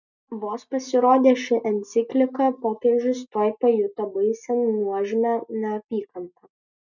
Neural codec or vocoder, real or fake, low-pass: none; real; 7.2 kHz